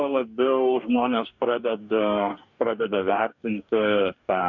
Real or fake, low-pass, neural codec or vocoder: fake; 7.2 kHz; codec, 44.1 kHz, 2.6 kbps, DAC